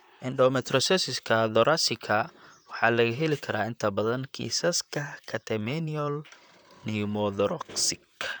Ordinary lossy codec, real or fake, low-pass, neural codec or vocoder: none; fake; none; vocoder, 44.1 kHz, 128 mel bands, Pupu-Vocoder